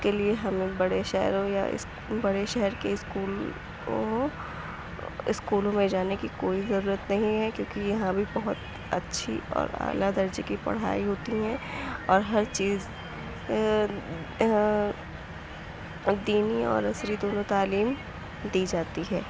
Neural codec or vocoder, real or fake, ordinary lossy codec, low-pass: none; real; none; none